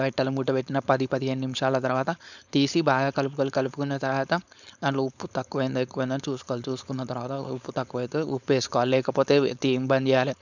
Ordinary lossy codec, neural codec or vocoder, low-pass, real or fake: none; codec, 16 kHz, 4.8 kbps, FACodec; 7.2 kHz; fake